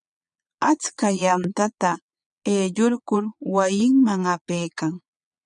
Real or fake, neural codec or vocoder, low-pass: fake; vocoder, 22.05 kHz, 80 mel bands, Vocos; 9.9 kHz